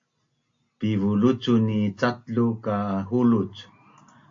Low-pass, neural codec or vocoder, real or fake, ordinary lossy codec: 7.2 kHz; none; real; AAC, 48 kbps